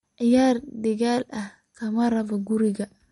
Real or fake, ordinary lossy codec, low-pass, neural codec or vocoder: real; MP3, 48 kbps; 19.8 kHz; none